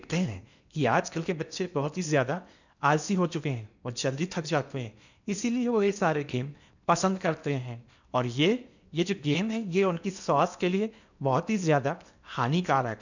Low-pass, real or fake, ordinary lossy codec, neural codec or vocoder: 7.2 kHz; fake; none; codec, 16 kHz in and 24 kHz out, 0.8 kbps, FocalCodec, streaming, 65536 codes